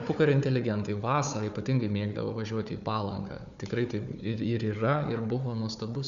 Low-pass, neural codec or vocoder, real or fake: 7.2 kHz; codec, 16 kHz, 4 kbps, FunCodec, trained on Chinese and English, 50 frames a second; fake